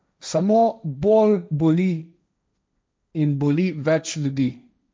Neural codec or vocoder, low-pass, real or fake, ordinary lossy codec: codec, 16 kHz, 1.1 kbps, Voila-Tokenizer; none; fake; none